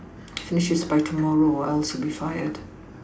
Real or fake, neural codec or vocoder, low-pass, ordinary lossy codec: real; none; none; none